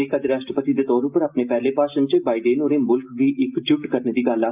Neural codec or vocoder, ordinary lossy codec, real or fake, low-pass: none; Opus, 24 kbps; real; 3.6 kHz